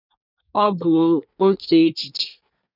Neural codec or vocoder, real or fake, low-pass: codec, 24 kHz, 1 kbps, SNAC; fake; 5.4 kHz